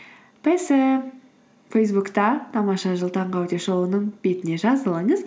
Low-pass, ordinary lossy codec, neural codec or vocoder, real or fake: none; none; none; real